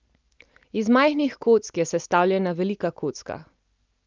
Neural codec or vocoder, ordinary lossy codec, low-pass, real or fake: none; Opus, 24 kbps; 7.2 kHz; real